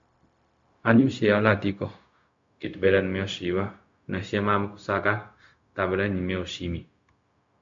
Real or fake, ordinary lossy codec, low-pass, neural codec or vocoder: fake; MP3, 48 kbps; 7.2 kHz; codec, 16 kHz, 0.4 kbps, LongCat-Audio-Codec